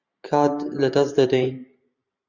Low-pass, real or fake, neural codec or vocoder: 7.2 kHz; fake; vocoder, 44.1 kHz, 128 mel bands every 512 samples, BigVGAN v2